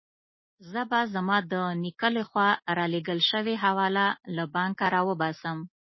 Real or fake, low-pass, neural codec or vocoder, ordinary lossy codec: real; 7.2 kHz; none; MP3, 24 kbps